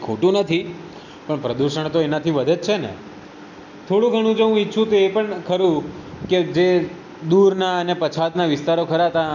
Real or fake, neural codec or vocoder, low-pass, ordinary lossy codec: real; none; 7.2 kHz; none